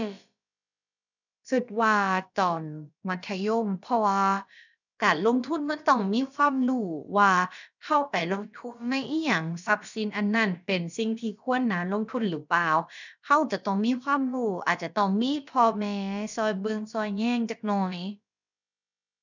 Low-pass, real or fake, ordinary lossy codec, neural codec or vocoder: 7.2 kHz; fake; none; codec, 16 kHz, about 1 kbps, DyCAST, with the encoder's durations